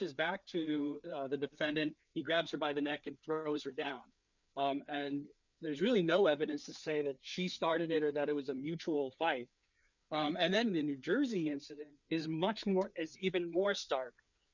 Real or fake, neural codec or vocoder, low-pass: fake; codec, 16 kHz, 4 kbps, FreqCodec, larger model; 7.2 kHz